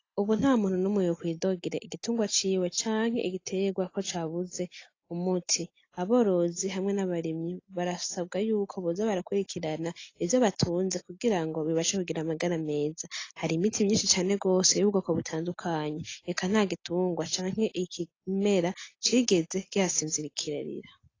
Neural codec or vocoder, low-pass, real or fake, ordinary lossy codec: none; 7.2 kHz; real; AAC, 32 kbps